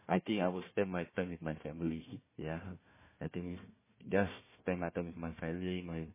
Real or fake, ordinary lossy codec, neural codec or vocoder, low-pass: fake; MP3, 16 kbps; codec, 16 kHz, 1 kbps, FunCodec, trained on Chinese and English, 50 frames a second; 3.6 kHz